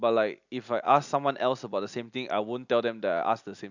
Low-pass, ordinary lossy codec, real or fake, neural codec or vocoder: 7.2 kHz; none; real; none